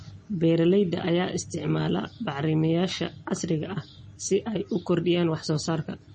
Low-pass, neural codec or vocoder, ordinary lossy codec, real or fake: 10.8 kHz; none; MP3, 32 kbps; real